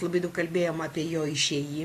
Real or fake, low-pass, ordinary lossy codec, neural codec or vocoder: real; 14.4 kHz; AAC, 48 kbps; none